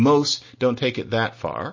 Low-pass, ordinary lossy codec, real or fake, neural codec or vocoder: 7.2 kHz; MP3, 32 kbps; real; none